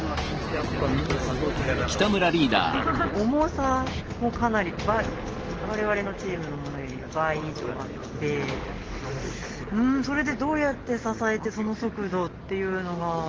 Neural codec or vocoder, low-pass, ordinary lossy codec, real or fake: none; 7.2 kHz; Opus, 16 kbps; real